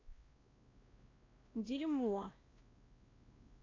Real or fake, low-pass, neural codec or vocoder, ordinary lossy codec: fake; 7.2 kHz; codec, 16 kHz, 1 kbps, X-Codec, WavLM features, trained on Multilingual LibriSpeech; AAC, 32 kbps